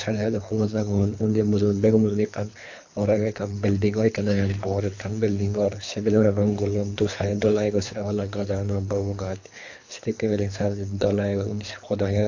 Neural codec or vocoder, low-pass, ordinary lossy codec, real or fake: codec, 24 kHz, 3 kbps, HILCodec; 7.2 kHz; AAC, 48 kbps; fake